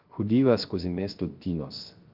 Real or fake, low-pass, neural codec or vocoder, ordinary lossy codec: fake; 5.4 kHz; codec, 16 kHz, 0.3 kbps, FocalCodec; Opus, 32 kbps